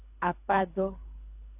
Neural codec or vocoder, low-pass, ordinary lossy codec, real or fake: codec, 24 kHz, 3 kbps, HILCodec; 3.6 kHz; AAC, 24 kbps; fake